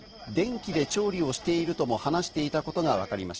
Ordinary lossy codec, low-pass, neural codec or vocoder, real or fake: Opus, 16 kbps; 7.2 kHz; none; real